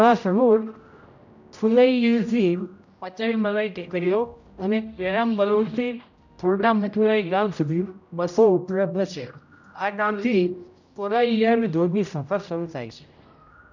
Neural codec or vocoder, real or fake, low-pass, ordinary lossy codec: codec, 16 kHz, 0.5 kbps, X-Codec, HuBERT features, trained on general audio; fake; 7.2 kHz; none